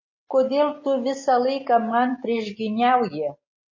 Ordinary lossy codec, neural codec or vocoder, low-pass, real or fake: MP3, 32 kbps; none; 7.2 kHz; real